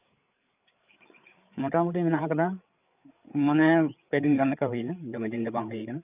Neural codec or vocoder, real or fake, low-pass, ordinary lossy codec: codec, 16 kHz, 8 kbps, FreqCodec, larger model; fake; 3.6 kHz; none